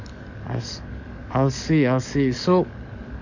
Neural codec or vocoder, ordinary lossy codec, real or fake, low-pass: codec, 44.1 kHz, 7.8 kbps, DAC; none; fake; 7.2 kHz